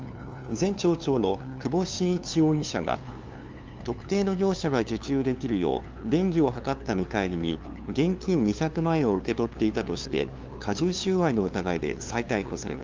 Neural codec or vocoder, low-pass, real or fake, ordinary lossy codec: codec, 16 kHz, 2 kbps, FunCodec, trained on LibriTTS, 25 frames a second; 7.2 kHz; fake; Opus, 32 kbps